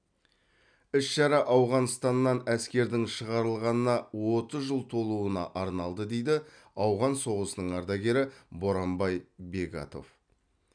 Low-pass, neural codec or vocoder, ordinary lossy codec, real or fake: 9.9 kHz; none; none; real